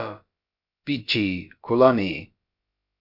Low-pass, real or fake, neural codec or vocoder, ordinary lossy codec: 5.4 kHz; fake; codec, 16 kHz, about 1 kbps, DyCAST, with the encoder's durations; Opus, 64 kbps